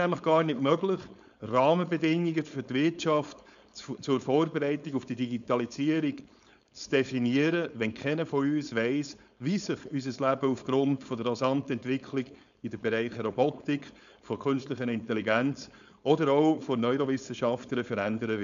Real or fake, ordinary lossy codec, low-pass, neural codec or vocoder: fake; MP3, 96 kbps; 7.2 kHz; codec, 16 kHz, 4.8 kbps, FACodec